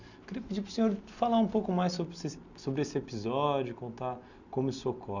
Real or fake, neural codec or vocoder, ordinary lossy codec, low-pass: real; none; none; 7.2 kHz